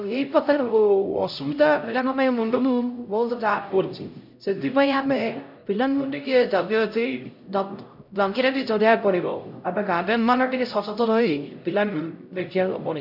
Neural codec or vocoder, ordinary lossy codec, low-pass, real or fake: codec, 16 kHz, 0.5 kbps, X-Codec, HuBERT features, trained on LibriSpeech; none; 5.4 kHz; fake